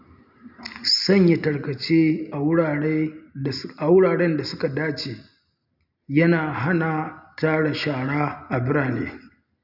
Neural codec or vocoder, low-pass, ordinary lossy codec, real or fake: none; 5.4 kHz; none; real